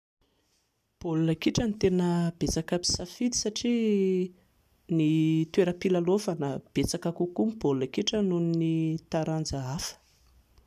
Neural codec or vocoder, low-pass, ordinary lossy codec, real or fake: none; 14.4 kHz; none; real